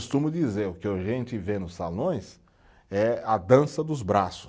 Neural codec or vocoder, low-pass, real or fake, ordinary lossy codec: none; none; real; none